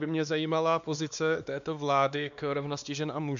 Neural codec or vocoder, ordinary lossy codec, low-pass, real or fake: codec, 16 kHz, 2 kbps, X-Codec, WavLM features, trained on Multilingual LibriSpeech; AAC, 96 kbps; 7.2 kHz; fake